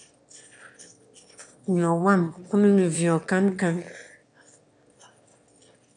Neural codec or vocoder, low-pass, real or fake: autoencoder, 22.05 kHz, a latent of 192 numbers a frame, VITS, trained on one speaker; 9.9 kHz; fake